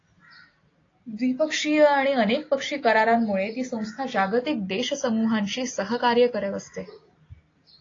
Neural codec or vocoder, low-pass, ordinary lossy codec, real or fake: none; 7.2 kHz; AAC, 32 kbps; real